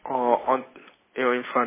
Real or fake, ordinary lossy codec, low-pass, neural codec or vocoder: real; MP3, 16 kbps; 3.6 kHz; none